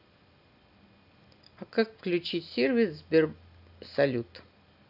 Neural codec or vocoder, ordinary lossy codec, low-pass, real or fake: none; none; 5.4 kHz; real